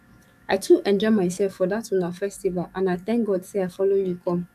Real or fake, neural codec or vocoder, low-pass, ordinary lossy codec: fake; codec, 44.1 kHz, 7.8 kbps, DAC; 14.4 kHz; none